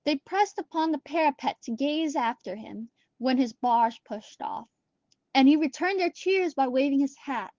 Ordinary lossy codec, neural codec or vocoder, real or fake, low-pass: Opus, 16 kbps; none; real; 7.2 kHz